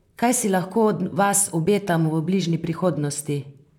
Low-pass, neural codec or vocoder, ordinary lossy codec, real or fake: 19.8 kHz; vocoder, 44.1 kHz, 128 mel bands, Pupu-Vocoder; none; fake